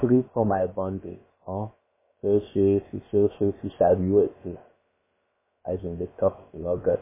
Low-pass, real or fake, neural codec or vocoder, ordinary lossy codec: 3.6 kHz; fake; codec, 16 kHz, about 1 kbps, DyCAST, with the encoder's durations; MP3, 16 kbps